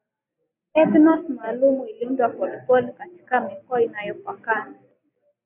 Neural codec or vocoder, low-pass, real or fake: none; 3.6 kHz; real